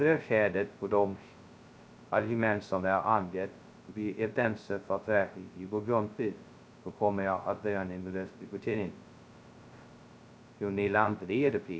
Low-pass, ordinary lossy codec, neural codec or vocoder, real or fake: none; none; codec, 16 kHz, 0.2 kbps, FocalCodec; fake